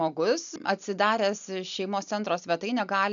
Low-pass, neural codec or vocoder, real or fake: 7.2 kHz; none; real